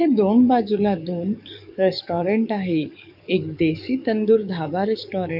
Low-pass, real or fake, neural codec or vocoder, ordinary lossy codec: 5.4 kHz; fake; codec, 44.1 kHz, 7.8 kbps, DAC; none